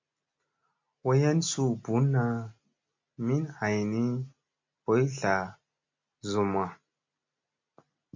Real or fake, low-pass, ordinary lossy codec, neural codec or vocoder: real; 7.2 kHz; MP3, 48 kbps; none